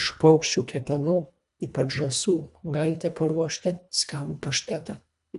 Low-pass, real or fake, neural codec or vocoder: 10.8 kHz; fake; codec, 24 kHz, 1.5 kbps, HILCodec